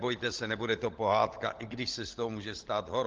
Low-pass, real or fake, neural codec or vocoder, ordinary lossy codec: 7.2 kHz; fake; codec, 16 kHz, 16 kbps, FunCodec, trained on LibriTTS, 50 frames a second; Opus, 16 kbps